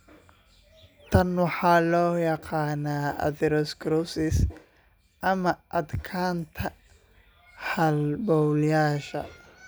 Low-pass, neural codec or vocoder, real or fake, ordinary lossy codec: none; none; real; none